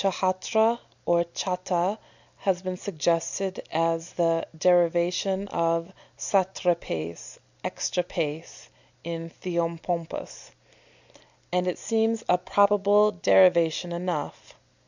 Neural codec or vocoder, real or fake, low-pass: none; real; 7.2 kHz